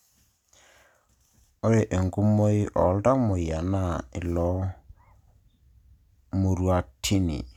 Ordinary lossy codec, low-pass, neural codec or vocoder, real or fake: none; 19.8 kHz; none; real